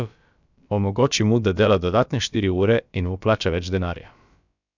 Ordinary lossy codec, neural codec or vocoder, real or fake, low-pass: none; codec, 16 kHz, about 1 kbps, DyCAST, with the encoder's durations; fake; 7.2 kHz